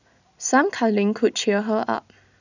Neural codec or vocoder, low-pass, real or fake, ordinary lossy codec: none; 7.2 kHz; real; none